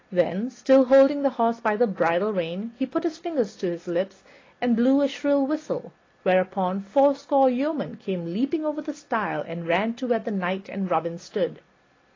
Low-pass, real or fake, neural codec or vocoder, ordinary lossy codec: 7.2 kHz; real; none; AAC, 32 kbps